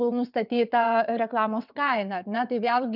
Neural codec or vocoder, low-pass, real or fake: vocoder, 22.05 kHz, 80 mel bands, WaveNeXt; 5.4 kHz; fake